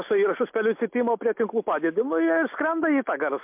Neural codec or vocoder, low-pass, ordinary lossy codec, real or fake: none; 3.6 kHz; MP3, 32 kbps; real